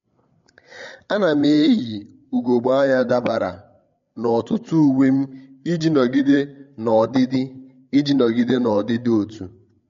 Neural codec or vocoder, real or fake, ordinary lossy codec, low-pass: codec, 16 kHz, 8 kbps, FreqCodec, larger model; fake; MP3, 48 kbps; 7.2 kHz